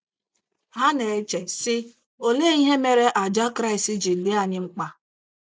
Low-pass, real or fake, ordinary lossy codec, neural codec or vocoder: none; real; none; none